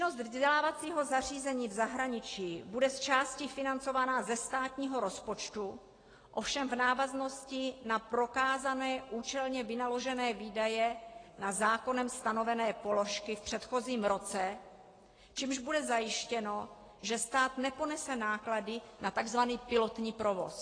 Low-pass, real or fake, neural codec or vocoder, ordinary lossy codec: 9.9 kHz; real; none; AAC, 32 kbps